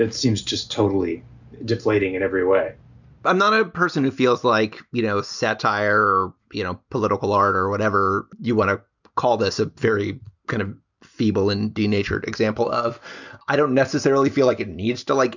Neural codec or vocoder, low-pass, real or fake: none; 7.2 kHz; real